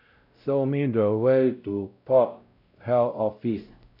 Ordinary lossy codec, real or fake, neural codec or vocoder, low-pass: none; fake; codec, 16 kHz, 0.5 kbps, X-Codec, WavLM features, trained on Multilingual LibriSpeech; 5.4 kHz